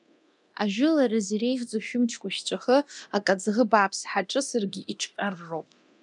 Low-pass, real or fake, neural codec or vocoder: 10.8 kHz; fake; codec, 24 kHz, 0.9 kbps, DualCodec